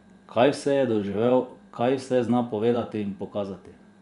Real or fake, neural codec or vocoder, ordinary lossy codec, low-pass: fake; vocoder, 24 kHz, 100 mel bands, Vocos; none; 10.8 kHz